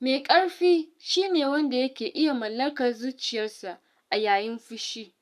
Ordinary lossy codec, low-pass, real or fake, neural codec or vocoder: none; 14.4 kHz; fake; codec, 44.1 kHz, 7.8 kbps, Pupu-Codec